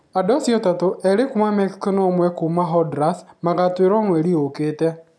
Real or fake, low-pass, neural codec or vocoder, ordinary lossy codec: real; none; none; none